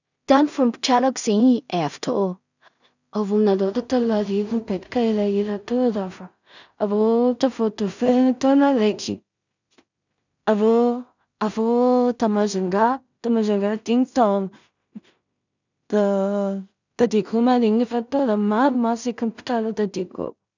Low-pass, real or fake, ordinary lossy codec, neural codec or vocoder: 7.2 kHz; fake; none; codec, 16 kHz in and 24 kHz out, 0.4 kbps, LongCat-Audio-Codec, two codebook decoder